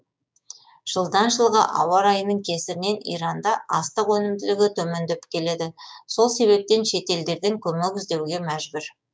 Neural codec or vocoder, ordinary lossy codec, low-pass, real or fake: codec, 16 kHz, 6 kbps, DAC; none; none; fake